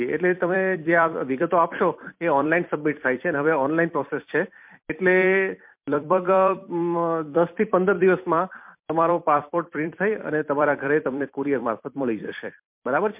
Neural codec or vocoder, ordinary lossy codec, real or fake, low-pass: vocoder, 44.1 kHz, 128 mel bands every 256 samples, BigVGAN v2; MP3, 32 kbps; fake; 3.6 kHz